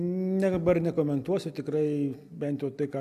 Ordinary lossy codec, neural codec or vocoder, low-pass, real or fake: MP3, 96 kbps; none; 14.4 kHz; real